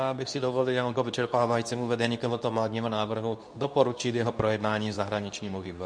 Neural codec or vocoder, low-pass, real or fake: codec, 24 kHz, 0.9 kbps, WavTokenizer, medium speech release version 2; 9.9 kHz; fake